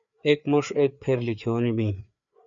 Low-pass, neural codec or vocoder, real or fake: 7.2 kHz; codec, 16 kHz, 4 kbps, FreqCodec, larger model; fake